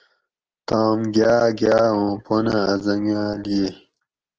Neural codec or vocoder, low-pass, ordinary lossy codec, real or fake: none; 7.2 kHz; Opus, 16 kbps; real